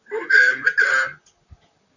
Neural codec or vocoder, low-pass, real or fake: vocoder, 44.1 kHz, 128 mel bands, Pupu-Vocoder; 7.2 kHz; fake